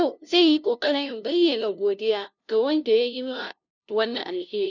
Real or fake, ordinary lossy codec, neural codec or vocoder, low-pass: fake; none; codec, 16 kHz, 0.5 kbps, FunCodec, trained on LibriTTS, 25 frames a second; 7.2 kHz